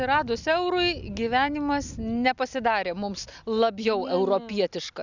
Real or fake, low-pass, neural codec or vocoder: real; 7.2 kHz; none